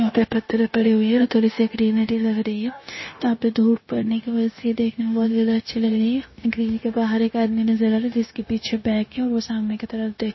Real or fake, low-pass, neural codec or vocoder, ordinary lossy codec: fake; 7.2 kHz; codec, 16 kHz, 0.9 kbps, LongCat-Audio-Codec; MP3, 24 kbps